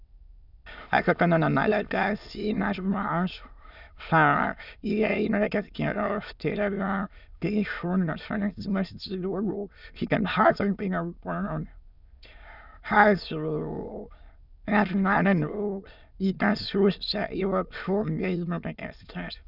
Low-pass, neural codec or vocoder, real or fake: 5.4 kHz; autoencoder, 22.05 kHz, a latent of 192 numbers a frame, VITS, trained on many speakers; fake